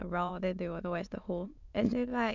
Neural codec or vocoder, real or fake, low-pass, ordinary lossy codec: autoencoder, 22.05 kHz, a latent of 192 numbers a frame, VITS, trained on many speakers; fake; 7.2 kHz; none